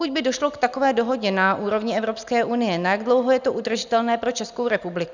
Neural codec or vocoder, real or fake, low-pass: none; real; 7.2 kHz